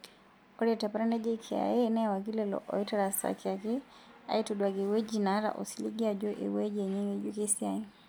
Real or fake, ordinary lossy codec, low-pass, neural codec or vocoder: real; none; none; none